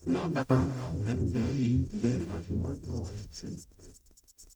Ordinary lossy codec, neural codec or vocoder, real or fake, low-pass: none; codec, 44.1 kHz, 0.9 kbps, DAC; fake; 19.8 kHz